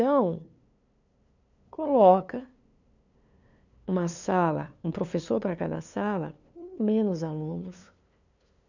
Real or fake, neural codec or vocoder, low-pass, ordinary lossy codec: fake; codec, 16 kHz, 2 kbps, FunCodec, trained on LibriTTS, 25 frames a second; 7.2 kHz; none